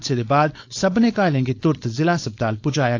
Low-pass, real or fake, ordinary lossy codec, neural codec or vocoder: 7.2 kHz; fake; AAC, 48 kbps; codec, 16 kHz, 4.8 kbps, FACodec